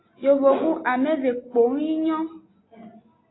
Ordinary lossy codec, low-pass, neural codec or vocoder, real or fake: AAC, 16 kbps; 7.2 kHz; none; real